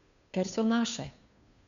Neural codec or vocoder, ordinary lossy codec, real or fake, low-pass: codec, 16 kHz, 2 kbps, FunCodec, trained on Chinese and English, 25 frames a second; none; fake; 7.2 kHz